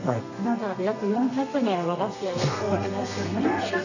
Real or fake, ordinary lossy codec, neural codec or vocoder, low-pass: fake; none; codec, 32 kHz, 1.9 kbps, SNAC; 7.2 kHz